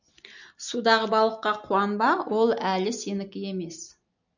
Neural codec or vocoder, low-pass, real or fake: none; 7.2 kHz; real